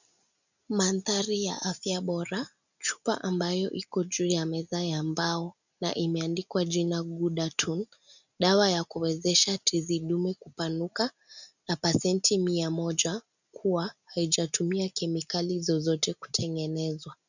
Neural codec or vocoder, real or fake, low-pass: none; real; 7.2 kHz